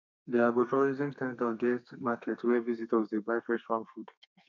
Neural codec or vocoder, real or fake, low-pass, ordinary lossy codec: codec, 32 kHz, 1.9 kbps, SNAC; fake; 7.2 kHz; none